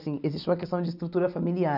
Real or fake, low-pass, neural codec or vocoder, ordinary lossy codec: real; 5.4 kHz; none; none